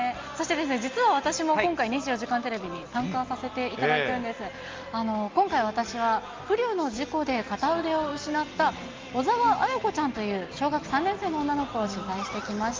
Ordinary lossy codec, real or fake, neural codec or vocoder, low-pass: Opus, 32 kbps; real; none; 7.2 kHz